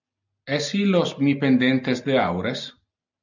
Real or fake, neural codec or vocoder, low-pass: real; none; 7.2 kHz